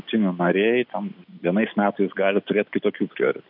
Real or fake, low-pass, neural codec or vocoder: real; 5.4 kHz; none